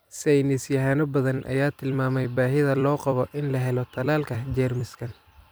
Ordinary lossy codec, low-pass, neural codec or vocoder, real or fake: none; none; vocoder, 44.1 kHz, 128 mel bands every 256 samples, BigVGAN v2; fake